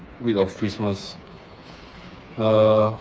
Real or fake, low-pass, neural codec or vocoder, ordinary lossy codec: fake; none; codec, 16 kHz, 4 kbps, FreqCodec, smaller model; none